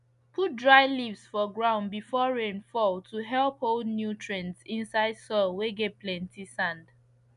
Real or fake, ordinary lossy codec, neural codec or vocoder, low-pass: real; none; none; 10.8 kHz